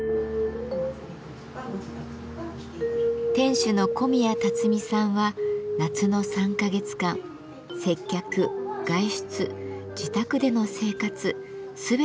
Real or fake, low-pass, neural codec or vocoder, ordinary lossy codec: real; none; none; none